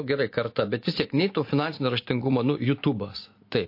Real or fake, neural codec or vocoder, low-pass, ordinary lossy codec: real; none; 5.4 kHz; MP3, 32 kbps